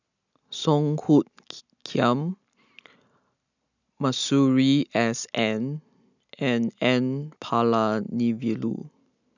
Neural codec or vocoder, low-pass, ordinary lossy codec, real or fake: none; 7.2 kHz; none; real